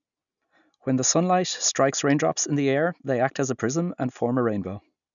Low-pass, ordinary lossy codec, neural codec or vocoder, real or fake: 7.2 kHz; none; none; real